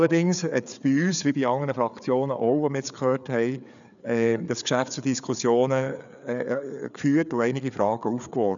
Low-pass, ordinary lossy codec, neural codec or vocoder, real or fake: 7.2 kHz; none; codec, 16 kHz, 4 kbps, FreqCodec, larger model; fake